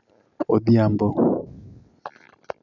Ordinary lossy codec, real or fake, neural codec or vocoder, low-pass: none; real; none; 7.2 kHz